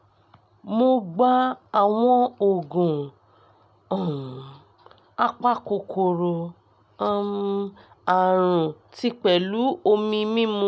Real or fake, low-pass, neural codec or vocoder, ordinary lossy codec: real; none; none; none